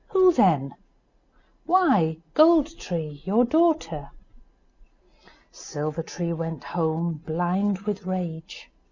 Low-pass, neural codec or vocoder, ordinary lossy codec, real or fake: 7.2 kHz; none; Opus, 64 kbps; real